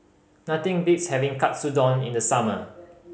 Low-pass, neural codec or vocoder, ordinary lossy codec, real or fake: none; none; none; real